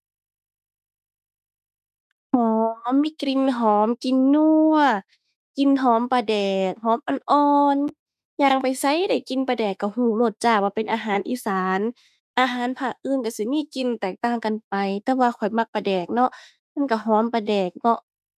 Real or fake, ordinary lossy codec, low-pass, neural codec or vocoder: fake; none; 14.4 kHz; autoencoder, 48 kHz, 32 numbers a frame, DAC-VAE, trained on Japanese speech